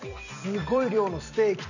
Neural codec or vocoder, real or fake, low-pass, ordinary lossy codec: vocoder, 44.1 kHz, 128 mel bands every 256 samples, BigVGAN v2; fake; 7.2 kHz; none